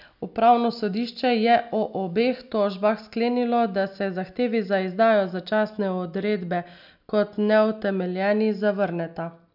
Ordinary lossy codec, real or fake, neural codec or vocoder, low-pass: none; real; none; 5.4 kHz